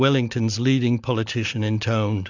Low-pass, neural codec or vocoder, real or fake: 7.2 kHz; none; real